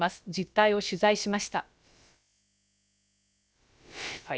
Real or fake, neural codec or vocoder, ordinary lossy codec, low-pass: fake; codec, 16 kHz, about 1 kbps, DyCAST, with the encoder's durations; none; none